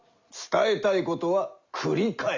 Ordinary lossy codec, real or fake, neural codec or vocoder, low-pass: Opus, 64 kbps; real; none; 7.2 kHz